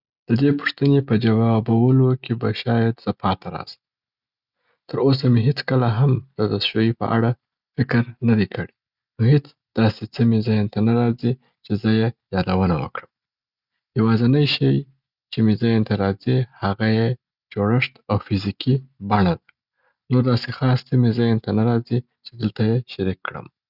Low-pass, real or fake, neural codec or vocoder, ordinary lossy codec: 5.4 kHz; real; none; Opus, 64 kbps